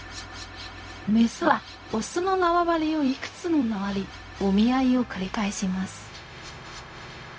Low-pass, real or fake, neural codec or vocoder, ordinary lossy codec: none; fake; codec, 16 kHz, 0.4 kbps, LongCat-Audio-Codec; none